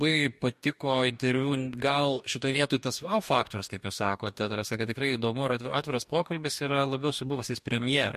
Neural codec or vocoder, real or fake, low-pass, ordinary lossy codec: codec, 44.1 kHz, 2.6 kbps, DAC; fake; 14.4 kHz; MP3, 64 kbps